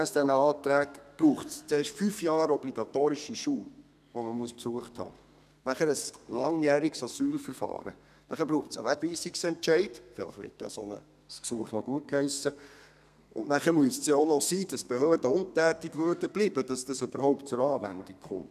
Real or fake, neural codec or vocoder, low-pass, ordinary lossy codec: fake; codec, 32 kHz, 1.9 kbps, SNAC; 14.4 kHz; none